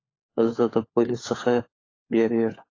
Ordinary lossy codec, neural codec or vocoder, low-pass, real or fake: AAC, 32 kbps; codec, 16 kHz, 4 kbps, FunCodec, trained on LibriTTS, 50 frames a second; 7.2 kHz; fake